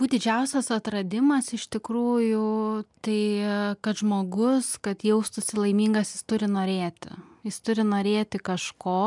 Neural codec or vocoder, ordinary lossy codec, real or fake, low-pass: none; MP3, 96 kbps; real; 10.8 kHz